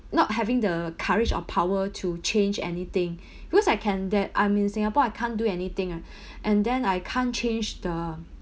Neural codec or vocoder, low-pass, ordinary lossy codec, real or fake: none; none; none; real